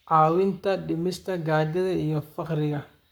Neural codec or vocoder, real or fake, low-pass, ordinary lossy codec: codec, 44.1 kHz, 7.8 kbps, Pupu-Codec; fake; none; none